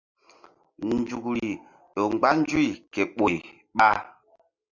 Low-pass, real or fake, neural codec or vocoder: 7.2 kHz; real; none